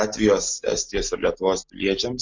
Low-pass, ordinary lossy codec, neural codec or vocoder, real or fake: 7.2 kHz; MP3, 64 kbps; none; real